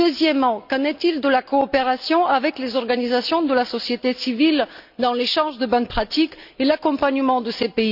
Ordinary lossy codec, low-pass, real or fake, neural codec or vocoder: none; 5.4 kHz; real; none